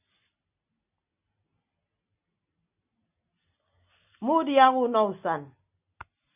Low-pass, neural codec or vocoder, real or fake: 3.6 kHz; none; real